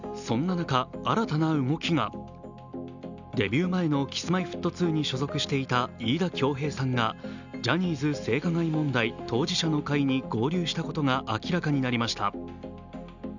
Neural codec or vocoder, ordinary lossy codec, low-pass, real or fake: none; none; 7.2 kHz; real